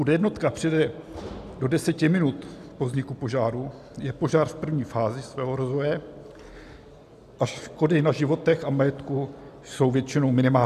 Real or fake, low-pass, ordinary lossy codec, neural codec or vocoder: real; 14.4 kHz; AAC, 96 kbps; none